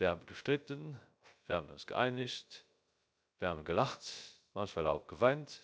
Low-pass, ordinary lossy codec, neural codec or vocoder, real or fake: none; none; codec, 16 kHz, 0.3 kbps, FocalCodec; fake